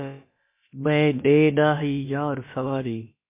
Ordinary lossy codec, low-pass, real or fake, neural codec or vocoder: MP3, 24 kbps; 3.6 kHz; fake; codec, 16 kHz, about 1 kbps, DyCAST, with the encoder's durations